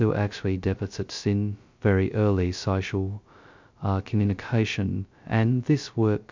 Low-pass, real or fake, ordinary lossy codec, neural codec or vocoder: 7.2 kHz; fake; MP3, 64 kbps; codec, 16 kHz, 0.2 kbps, FocalCodec